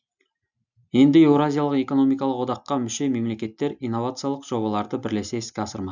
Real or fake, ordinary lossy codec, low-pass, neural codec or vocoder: real; none; 7.2 kHz; none